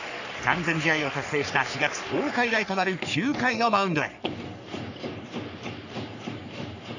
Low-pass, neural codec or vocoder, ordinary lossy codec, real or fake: 7.2 kHz; codec, 44.1 kHz, 3.4 kbps, Pupu-Codec; none; fake